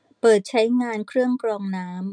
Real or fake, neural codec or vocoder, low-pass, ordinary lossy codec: real; none; 9.9 kHz; none